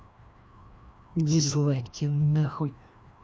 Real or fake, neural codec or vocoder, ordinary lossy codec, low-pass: fake; codec, 16 kHz, 1 kbps, FreqCodec, larger model; none; none